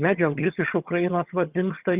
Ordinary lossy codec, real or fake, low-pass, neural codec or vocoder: Opus, 64 kbps; fake; 3.6 kHz; vocoder, 22.05 kHz, 80 mel bands, HiFi-GAN